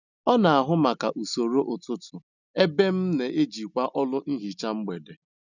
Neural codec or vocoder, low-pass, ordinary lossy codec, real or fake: none; 7.2 kHz; none; real